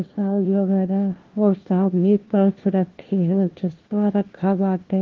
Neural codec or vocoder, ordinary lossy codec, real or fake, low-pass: codec, 16 kHz, 1 kbps, FunCodec, trained on LibriTTS, 50 frames a second; Opus, 16 kbps; fake; 7.2 kHz